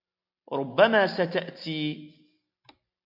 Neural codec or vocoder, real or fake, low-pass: none; real; 5.4 kHz